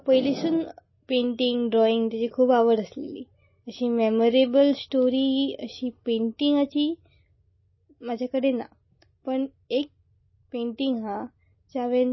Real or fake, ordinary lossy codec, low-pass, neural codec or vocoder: real; MP3, 24 kbps; 7.2 kHz; none